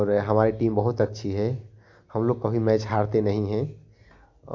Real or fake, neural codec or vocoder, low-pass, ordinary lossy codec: real; none; 7.2 kHz; AAC, 48 kbps